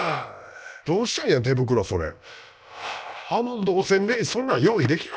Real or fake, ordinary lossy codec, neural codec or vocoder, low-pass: fake; none; codec, 16 kHz, about 1 kbps, DyCAST, with the encoder's durations; none